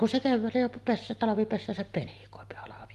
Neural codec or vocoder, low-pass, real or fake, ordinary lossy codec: none; 14.4 kHz; real; Opus, 24 kbps